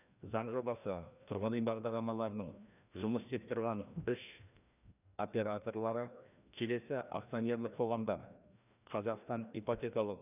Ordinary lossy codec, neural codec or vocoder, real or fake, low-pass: none; codec, 16 kHz, 1 kbps, FreqCodec, larger model; fake; 3.6 kHz